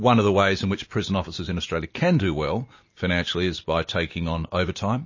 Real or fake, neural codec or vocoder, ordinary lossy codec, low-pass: real; none; MP3, 32 kbps; 7.2 kHz